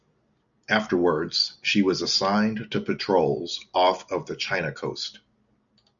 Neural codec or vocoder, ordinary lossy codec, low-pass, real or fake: none; MP3, 96 kbps; 7.2 kHz; real